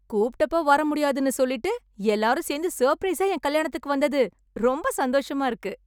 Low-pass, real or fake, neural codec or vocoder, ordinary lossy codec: none; real; none; none